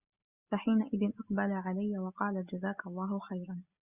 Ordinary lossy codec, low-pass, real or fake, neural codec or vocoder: Opus, 64 kbps; 3.6 kHz; real; none